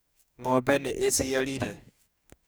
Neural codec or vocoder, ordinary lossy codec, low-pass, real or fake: codec, 44.1 kHz, 2.6 kbps, DAC; none; none; fake